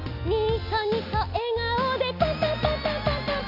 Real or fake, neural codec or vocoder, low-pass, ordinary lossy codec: real; none; 5.4 kHz; none